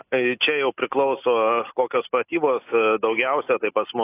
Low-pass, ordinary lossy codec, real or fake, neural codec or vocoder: 3.6 kHz; AAC, 24 kbps; real; none